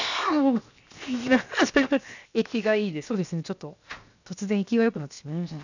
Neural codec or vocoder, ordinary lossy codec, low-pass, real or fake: codec, 16 kHz, 0.7 kbps, FocalCodec; none; 7.2 kHz; fake